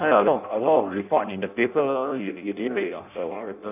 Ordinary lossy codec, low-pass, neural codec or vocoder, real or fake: none; 3.6 kHz; codec, 16 kHz in and 24 kHz out, 0.6 kbps, FireRedTTS-2 codec; fake